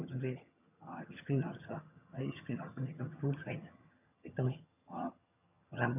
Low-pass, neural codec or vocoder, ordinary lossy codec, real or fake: 3.6 kHz; vocoder, 22.05 kHz, 80 mel bands, HiFi-GAN; none; fake